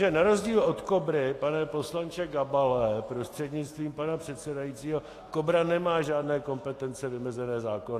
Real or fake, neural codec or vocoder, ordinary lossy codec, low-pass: fake; autoencoder, 48 kHz, 128 numbers a frame, DAC-VAE, trained on Japanese speech; AAC, 48 kbps; 14.4 kHz